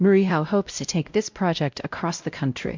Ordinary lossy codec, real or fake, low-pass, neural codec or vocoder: MP3, 64 kbps; fake; 7.2 kHz; codec, 16 kHz, 0.5 kbps, X-Codec, WavLM features, trained on Multilingual LibriSpeech